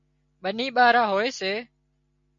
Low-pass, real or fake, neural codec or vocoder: 7.2 kHz; real; none